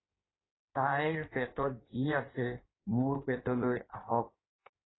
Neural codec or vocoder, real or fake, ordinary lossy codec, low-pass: codec, 16 kHz in and 24 kHz out, 1.1 kbps, FireRedTTS-2 codec; fake; AAC, 16 kbps; 7.2 kHz